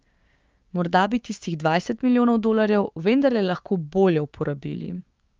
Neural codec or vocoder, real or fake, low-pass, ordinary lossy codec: codec, 16 kHz, 6 kbps, DAC; fake; 7.2 kHz; Opus, 24 kbps